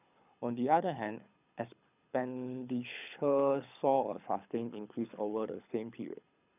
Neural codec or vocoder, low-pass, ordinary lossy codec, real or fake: codec, 24 kHz, 6 kbps, HILCodec; 3.6 kHz; none; fake